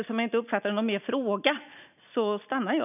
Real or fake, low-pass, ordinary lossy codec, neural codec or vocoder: real; 3.6 kHz; none; none